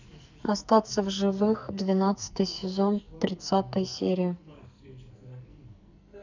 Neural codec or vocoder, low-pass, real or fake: codec, 44.1 kHz, 2.6 kbps, SNAC; 7.2 kHz; fake